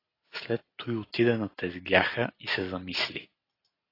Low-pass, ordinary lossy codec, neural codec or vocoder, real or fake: 5.4 kHz; AAC, 24 kbps; none; real